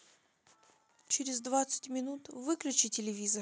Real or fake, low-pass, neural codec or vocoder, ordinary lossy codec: real; none; none; none